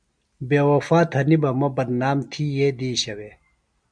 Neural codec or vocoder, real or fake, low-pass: none; real; 9.9 kHz